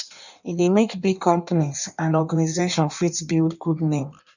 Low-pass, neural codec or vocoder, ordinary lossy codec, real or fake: 7.2 kHz; codec, 16 kHz in and 24 kHz out, 1.1 kbps, FireRedTTS-2 codec; none; fake